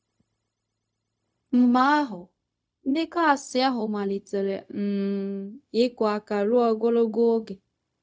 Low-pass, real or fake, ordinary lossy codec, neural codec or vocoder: none; fake; none; codec, 16 kHz, 0.4 kbps, LongCat-Audio-Codec